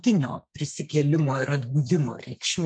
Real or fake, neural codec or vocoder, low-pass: fake; codec, 32 kHz, 1.9 kbps, SNAC; 9.9 kHz